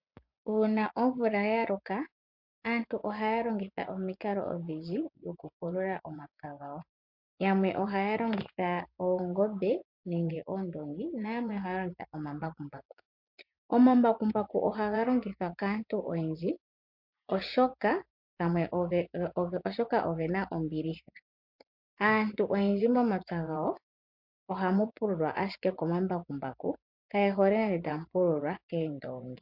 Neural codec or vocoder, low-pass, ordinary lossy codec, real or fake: vocoder, 24 kHz, 100 mel bands, Vocos; 5.4 kHz; MP3, 48 kbps; fake